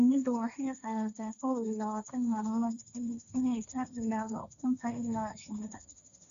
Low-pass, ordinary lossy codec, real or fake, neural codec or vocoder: 7.2 kHz; none; fake; codec, 16 kHz, 1.1 kbps, Voila-Tokenizer